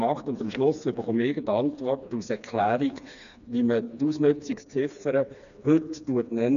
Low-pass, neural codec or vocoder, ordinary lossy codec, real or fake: 7.2 kHz; codec, 16 kHz, 2 kbps, FreqCodec, smaller model; none; fake